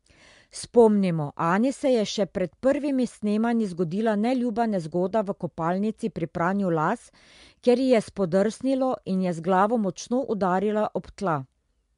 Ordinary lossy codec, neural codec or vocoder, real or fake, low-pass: MP3, 64 kbps; none; real; 10.8 kHz